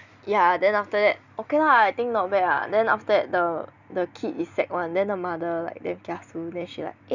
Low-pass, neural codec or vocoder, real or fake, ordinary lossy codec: 7.2 kHz; none; real; none